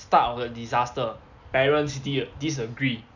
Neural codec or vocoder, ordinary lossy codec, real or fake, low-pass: none; none; real; 7.2 kHz